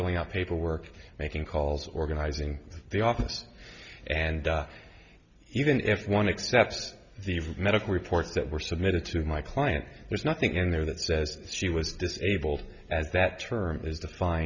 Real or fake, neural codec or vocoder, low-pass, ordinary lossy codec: real; none; 7.2 kHz; Opus, 64 kbps